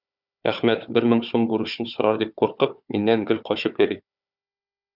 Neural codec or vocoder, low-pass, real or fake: codec, 16 kHz, 4 kbps, FunCodec, trained on Chinese and English, 50 frames a second; 5.4 kHz; fake